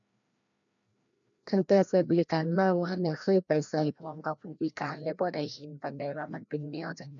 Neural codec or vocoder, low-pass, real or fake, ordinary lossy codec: codec, 16 kHz, 1 kbps, FreqCodec, larger model; 7.2 kHz; fake; none